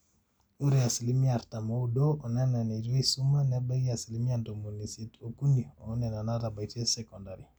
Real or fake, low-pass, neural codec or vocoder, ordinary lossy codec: real; none; none; none